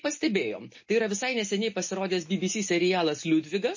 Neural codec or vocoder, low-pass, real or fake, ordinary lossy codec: none; 7.2 kHz; real; MP3, 32 kbps